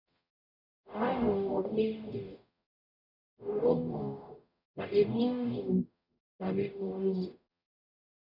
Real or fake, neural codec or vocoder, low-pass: fake; codec, 44.1 kHz, 0.9 kbps, DAC; 5.4 kHz